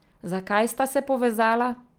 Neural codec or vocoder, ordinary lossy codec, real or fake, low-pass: none; Opus, 24 kbps; real; 19.8 kHz